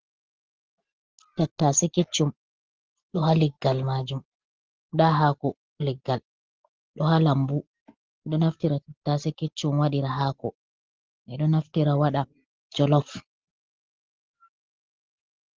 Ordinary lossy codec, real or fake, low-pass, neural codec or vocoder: Opus, 16 kbps; real; 7.2 kHz; none